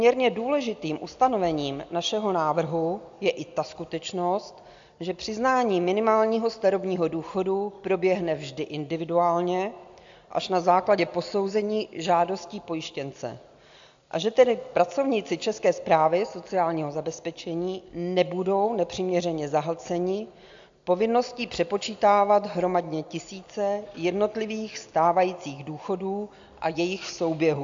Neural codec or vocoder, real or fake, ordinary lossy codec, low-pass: none; real; AAC, 64 kbps; 7.2 kHz